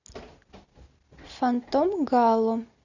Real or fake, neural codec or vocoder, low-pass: real; none; 7.2 kHz